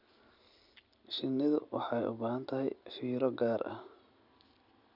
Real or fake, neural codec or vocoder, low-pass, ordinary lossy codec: real; none; 5.4 kHz; none